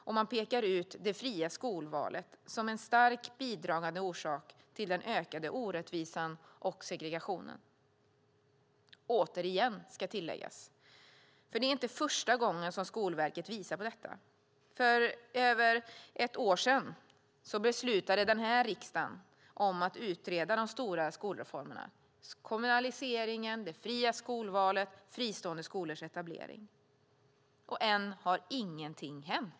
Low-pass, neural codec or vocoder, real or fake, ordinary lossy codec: none; none; real; none